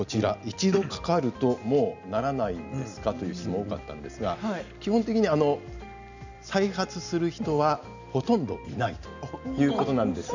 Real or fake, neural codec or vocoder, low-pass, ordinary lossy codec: real; none; 7.2 kHz; none